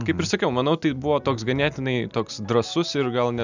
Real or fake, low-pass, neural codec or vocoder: real; 7.2 kHz; none